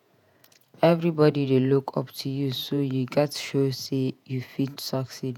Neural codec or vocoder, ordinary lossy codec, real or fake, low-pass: vocoder, 48 kHz, 128 mel bands, Vocos; none; fake; 19.8 kHz